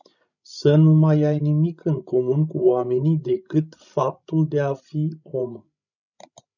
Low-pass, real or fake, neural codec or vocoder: 7.2 kHz; fake; codec, 16 kHz, 16 kbps, FreqCodec, larger model